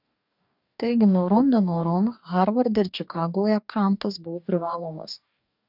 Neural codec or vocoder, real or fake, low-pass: codec, 44.1 kHz, 2.6 kbps, DAC; fake; 5.4 kHz